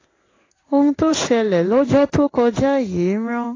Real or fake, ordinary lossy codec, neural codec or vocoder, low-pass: fake; AAC, 32 kbps; codec, 16 kHz in and 24 kHz out, 1 kbps, XY-Tokenizer; 7.2 kHz